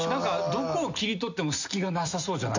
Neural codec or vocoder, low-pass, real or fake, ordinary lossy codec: none; 7.2 kHz; real; none